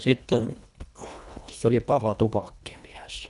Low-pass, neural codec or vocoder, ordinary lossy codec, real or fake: 10.8 kHz; codec, 24 kHz, 1.5 kbps, HILCodec; none; fake